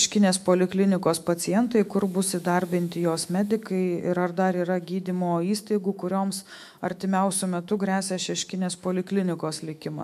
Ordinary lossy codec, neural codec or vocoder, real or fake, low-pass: MP3, 96 kbps; autoencoder, 48 kHz, 128 numbers a frame, DAC-VAE, trained on Japanese speech; fake; 14.4 kHz